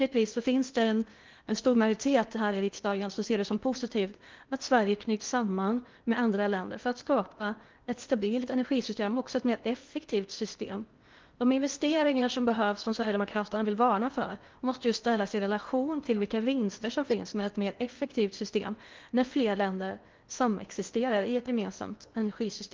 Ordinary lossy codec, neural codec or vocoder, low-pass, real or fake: Opus, 32 kbps; codec, 16 kHz in and 24 kHz out, 0.8 kbps, FocalCodec, streaming, 65536 codes; 7.2 kHz; fake